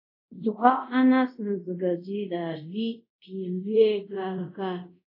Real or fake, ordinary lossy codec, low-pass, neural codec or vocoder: fake; AAC, 32 kbps; 5.4 kHz; codec, 24 kHz, 0.5 kbps, DualCodec